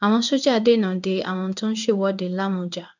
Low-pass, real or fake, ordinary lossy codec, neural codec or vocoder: 7.2 kHz; fake; none; codec, 16 kHz in and 24 kHz out, 1 kbps, XY-Tokenizer